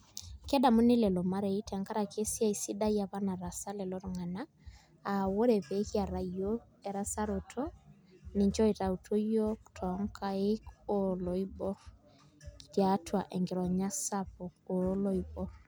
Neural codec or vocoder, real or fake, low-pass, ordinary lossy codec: none; real; none; none